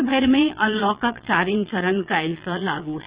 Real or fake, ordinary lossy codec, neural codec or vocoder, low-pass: fake; Opus, 64 kbps; vocoder, 22.05 kHz, 80 mel bands, Vocos; 3.6 kHz